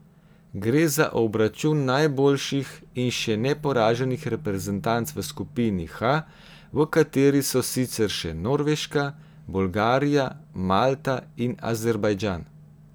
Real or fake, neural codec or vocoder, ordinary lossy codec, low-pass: real; none; none; none